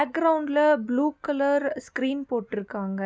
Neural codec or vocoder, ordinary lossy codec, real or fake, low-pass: none; none; real; none